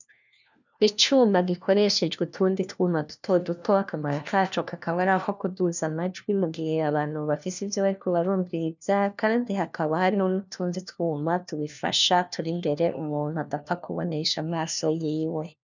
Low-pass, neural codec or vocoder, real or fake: 7.2 kHz; codec, 16 kHz, 1 kbps, FunCodec, trained on LibriTTS, 50 frames a second; fake